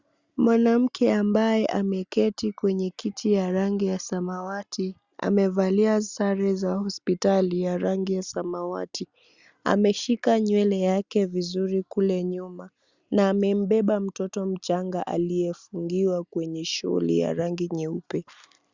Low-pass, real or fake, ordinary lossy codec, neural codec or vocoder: 7.2 kHz; real; Opus, 64 kbps; none